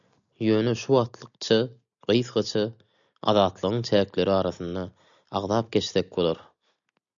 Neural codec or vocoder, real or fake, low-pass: none; real; 7.2 kHz